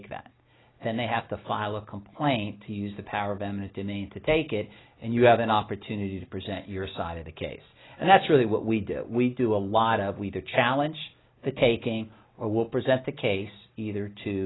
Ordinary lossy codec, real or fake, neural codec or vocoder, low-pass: AAC, 16 kbps; real; none; 7.2 kHz